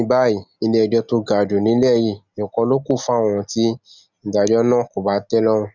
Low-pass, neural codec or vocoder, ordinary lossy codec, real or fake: 7.2 kHz; none; none; real